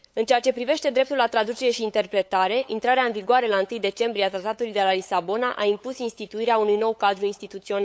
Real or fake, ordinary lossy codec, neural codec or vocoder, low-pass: fake; none; codec, 16 kHz, 8 kbps, FunCodec, trained on LibriTTS, 25 frames a second; none